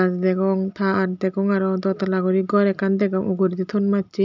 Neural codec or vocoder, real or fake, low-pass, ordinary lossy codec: none; real; 7.2 kHz; none